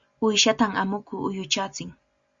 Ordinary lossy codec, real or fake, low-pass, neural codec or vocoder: Opus, 64 kbps; real; 7.2 kHz; none